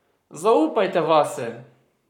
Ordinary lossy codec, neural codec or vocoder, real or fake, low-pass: none; codec, 44.1 kHz, 7.8 kbps, Pupu-Codec; fake; 19.8 kHz